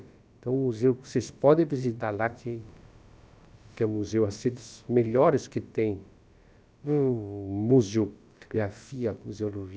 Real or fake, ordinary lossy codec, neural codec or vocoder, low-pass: fake; none; codec, 16 kHz, about 1 kbps, DyCAST, with the encoder's durations; none